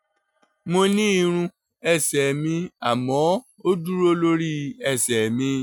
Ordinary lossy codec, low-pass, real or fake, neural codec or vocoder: none; 19.8 kHz; real; none